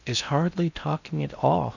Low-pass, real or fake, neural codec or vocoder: 7.2 kHz; fake; codec, 16 kHz in and 24 kHz out, 0.6 kbps, FocalCodec, streaming, 2048 codes